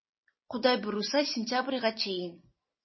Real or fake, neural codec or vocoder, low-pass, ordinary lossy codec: real; none; 7.2 kHz; MP3, 24 kbps